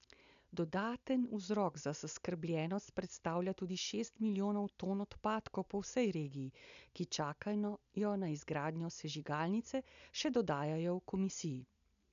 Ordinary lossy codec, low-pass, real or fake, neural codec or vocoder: none; 7.2 kHz; real; none